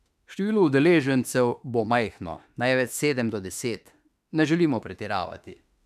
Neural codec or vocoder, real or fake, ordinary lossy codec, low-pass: autoencoder, 48 kHz, 32 numbers a frame, DAC-VAE, trained on Japanese speech; fake; none; 14.4 kHz